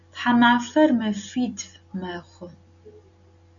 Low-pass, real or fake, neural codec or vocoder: 7.2 kHz; real; none